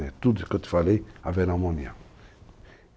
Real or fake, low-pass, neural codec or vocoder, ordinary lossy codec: real; none; none; none